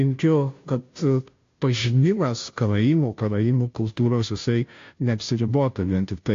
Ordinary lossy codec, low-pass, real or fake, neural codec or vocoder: AAC, 48 kbps; 7.2 kHz; fake; codec, 16 kHz, 0.5 kbps, FunCodec, trained on Chinese and English, 25 frames a second